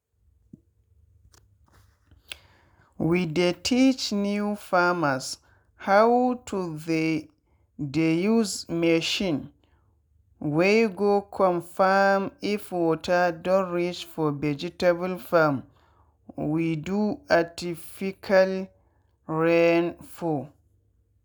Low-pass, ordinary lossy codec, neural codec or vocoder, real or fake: none; none; none; real